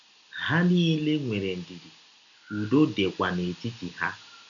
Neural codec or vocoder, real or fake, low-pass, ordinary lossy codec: none; real; 7.2 kHz; none